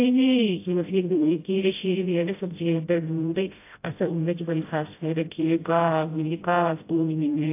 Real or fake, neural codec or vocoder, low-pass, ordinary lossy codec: fake; codec, 16 kHz, 0.5 kbps, FreqCodec, smaller model; 3.6 kHz; AAC, 24 kbps